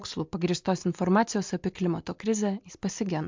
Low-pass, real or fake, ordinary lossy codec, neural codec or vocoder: 7.2 kHz; real; MP3, 64 kbps; none